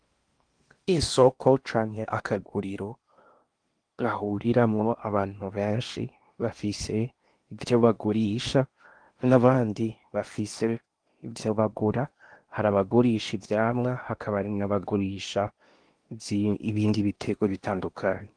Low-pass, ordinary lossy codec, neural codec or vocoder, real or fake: 9.9 kHz; Opus, 24 kbps; codec, 16 kHz in and 24 kHz out, 0.8 kbps, FocalCodec, streaming, 65536 codes; fake